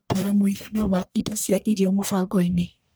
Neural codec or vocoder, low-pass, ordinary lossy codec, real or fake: codec, 44.1 kHz, 1.7 kbps, Pupu-Codec; none; none; fake